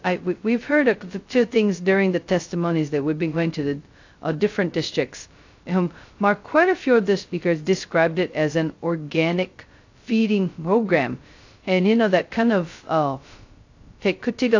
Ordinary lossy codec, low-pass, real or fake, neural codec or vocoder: AAC, 48 kbps; 7.2 kHz; fake; codec, 16 kHz, 0.2 kbps, FocalCodec